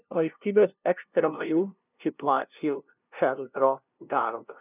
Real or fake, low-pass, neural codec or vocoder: fake; 3.6 kHz; codec, 16 kHz, 0.5 kbps, FunCodec, trained on LibriTTS, 25 frames a second